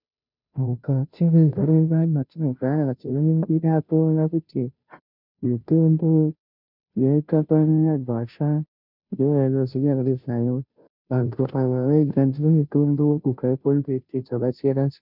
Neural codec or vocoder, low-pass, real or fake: codec, 16 kHz, 0.5 kbps, FunCodec, trained on Chinese and English, 25 frames a second; 5.4 kHz; fake